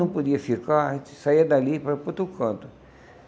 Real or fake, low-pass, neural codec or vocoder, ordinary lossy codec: real; none; none; none